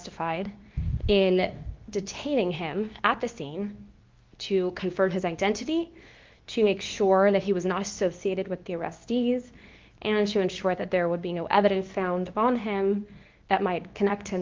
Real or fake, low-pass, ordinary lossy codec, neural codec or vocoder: fake; 7.2 kHz; Opus, 24 kbps; codec, 24 kHz, 0.9 kbps, WavTokenizer, medium speech release version 2